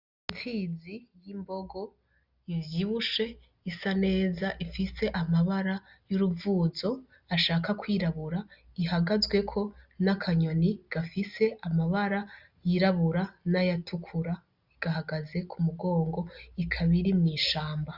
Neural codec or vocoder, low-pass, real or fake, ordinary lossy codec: none; 5.4 kHz; real; Opus, 64 kbps